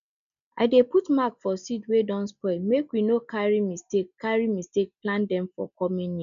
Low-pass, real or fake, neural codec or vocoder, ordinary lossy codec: 7.2 kHz; real; none; none